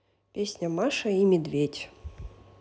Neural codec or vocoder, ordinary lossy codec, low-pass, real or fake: none; none; none; real